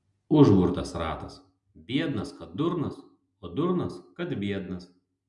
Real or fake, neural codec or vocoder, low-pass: real; none; 10.8 kHz